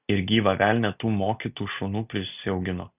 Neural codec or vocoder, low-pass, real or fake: none; 3.6 kHz; real